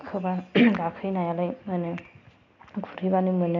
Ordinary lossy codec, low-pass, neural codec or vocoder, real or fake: none; 7.2 kHz; none; real